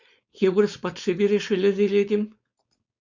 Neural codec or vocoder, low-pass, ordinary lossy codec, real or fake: codec, 16 kHz, 4.8 kbps, FACodec; 7.2 kHz; Opus, 64 kbps; fake